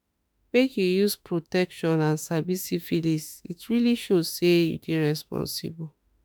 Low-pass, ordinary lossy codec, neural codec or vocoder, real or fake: none; none; autoencoder, 48 kHz, 32 numbers a frame, DAC-VAE, trained on Japanese speech; fake